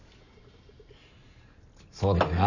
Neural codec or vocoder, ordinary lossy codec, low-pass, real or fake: none; none; 7.2 kHz; real